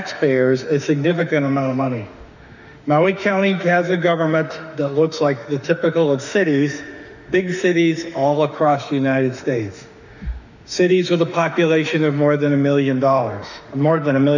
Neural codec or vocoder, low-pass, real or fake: autoencoder, 48 kHz, 32 numbers a frame, DAC-VAE, trained on Japanese speech; 7.2 kHz; fake